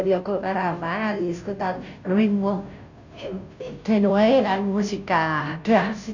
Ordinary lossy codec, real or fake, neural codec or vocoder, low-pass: none; fake; codec, 16 kHz, 0.5 kbps, FunCodec, trained on Chinese and English, 25 frames a second; 7.2 kHz